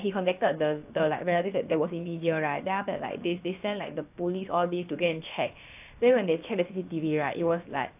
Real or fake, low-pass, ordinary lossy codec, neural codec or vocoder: fake; 3.6 kHz; AAC, 32 kbps; codec, 16 kHz, about 1 kbps, DyCAST, with the encoder's durations